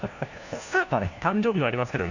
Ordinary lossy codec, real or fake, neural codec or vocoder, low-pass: none; fake; codec, 16 kHz, 1 kbps, FunCodec, trained on LibriTTS, 50 frames a second; 7.2 kHz